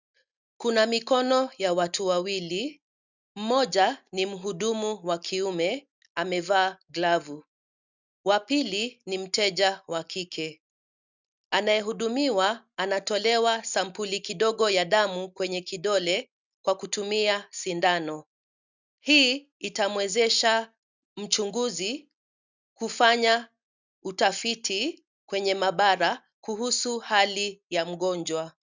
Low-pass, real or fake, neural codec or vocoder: 7.2 kHz; real; none